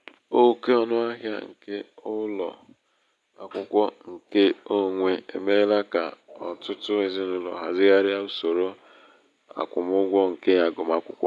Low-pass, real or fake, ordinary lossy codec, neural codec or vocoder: none; real; none; none